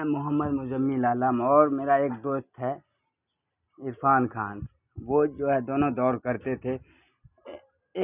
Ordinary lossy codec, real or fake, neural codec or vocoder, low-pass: none; real; none; 3.6 kHz